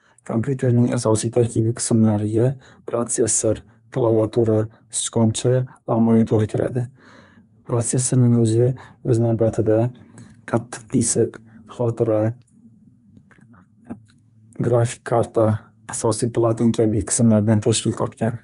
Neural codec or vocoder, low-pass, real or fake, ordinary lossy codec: codec, 24 kHz, 1 kbps, SNAC; 10.8 kHz; fake; none